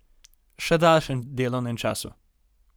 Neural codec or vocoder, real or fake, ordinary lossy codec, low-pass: none; real; none; none